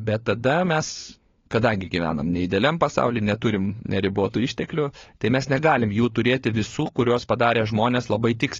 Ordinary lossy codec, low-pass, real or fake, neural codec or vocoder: AAC, 32 kbps; 7.2 kHz; fake; codec, 16 kHz, 4 kbps, FunCodec, trained on LibriTTS, 50 frames a second